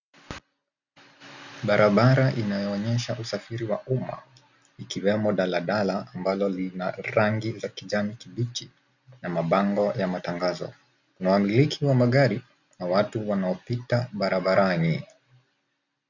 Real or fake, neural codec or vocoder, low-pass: real; none; 7.2 kHz